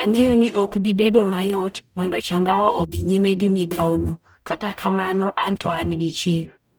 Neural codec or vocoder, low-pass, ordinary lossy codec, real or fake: codec, 44.1 kHz, 0.9 kbps, DAC; none; none; fake